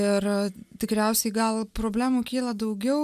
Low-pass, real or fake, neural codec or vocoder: 14.4 kHz; real; none